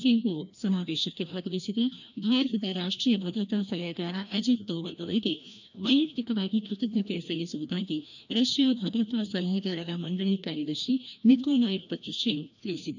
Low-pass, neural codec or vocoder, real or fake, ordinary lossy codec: 7.2 kHz; codec, 16 kHz, 1 kbps, FreqCodec, larger model; fake; none